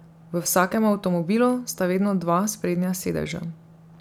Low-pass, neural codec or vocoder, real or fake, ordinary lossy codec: 19.8 kHz; none; real; none